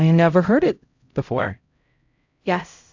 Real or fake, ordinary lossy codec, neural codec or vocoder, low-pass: fake; AAC, 48 kbps; codec, 16 kHz, 0.5 kbps, X-Codec, HuBERT features, trained on LibriSpeech; 7.2 kHz